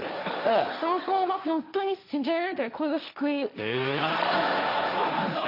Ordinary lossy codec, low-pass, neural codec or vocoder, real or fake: none; 5.4 kHz; codec, 16 kHz, 1.1 kbps, Voila-Tokenizer; fake